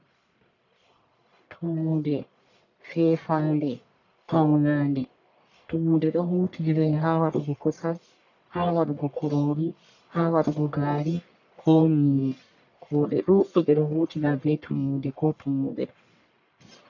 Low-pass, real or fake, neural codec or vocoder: 7.2 kHz; fake; codec, 44.1 kHz, 1.7 kbps, Pupu-Codec